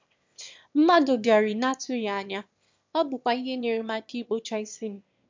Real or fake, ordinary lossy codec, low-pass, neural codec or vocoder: fake; MP3, 64 kbps; 7.2 kHz; autoencoder, 22.05 kHz, a latent of 192 numbers a frame, VITS, trained on one speaker